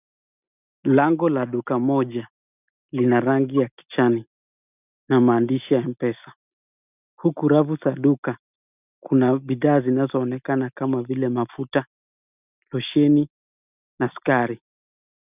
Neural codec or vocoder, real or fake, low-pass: none; real; 3.6 kHz